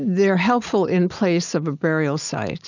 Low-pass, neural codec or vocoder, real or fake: 7.2 kHz; none; real